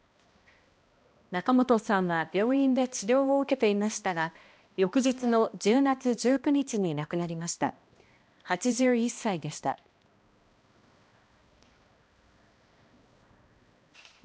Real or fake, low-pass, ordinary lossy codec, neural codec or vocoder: fake; none; none; codec, 16 kHz, 1 kbps, X-Codec, HuBERT features, trained on balanced general audio